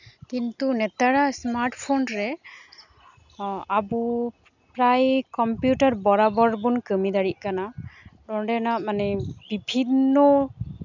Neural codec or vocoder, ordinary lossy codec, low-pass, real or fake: none; none; 7.2 kHz; real